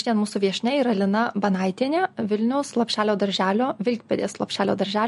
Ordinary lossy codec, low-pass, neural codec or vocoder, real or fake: MP3, 48 kbps; 14.4 kHz; none; real